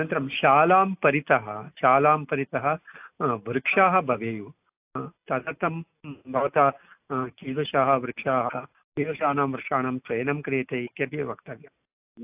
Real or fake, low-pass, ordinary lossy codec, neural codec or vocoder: real; 3.6 kHz; AAC, 32 kbps; none